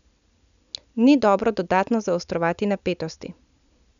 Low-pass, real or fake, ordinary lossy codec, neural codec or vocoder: 7.2 kHz; real; none; none